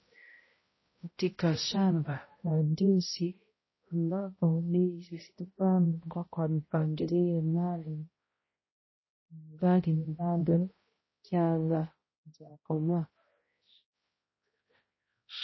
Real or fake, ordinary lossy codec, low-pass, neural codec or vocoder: fake; MP3, 24 kbps; 7.2 kHz; codec, 16 kHz, 0.5 kbps, X-Codec, HuBERT features, trained on balanced general audio